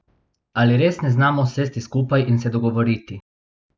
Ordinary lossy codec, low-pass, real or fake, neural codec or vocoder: none; none; real; none